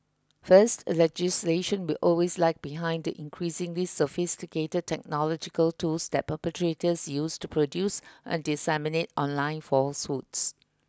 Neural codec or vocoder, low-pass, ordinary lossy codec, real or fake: none; none; none; real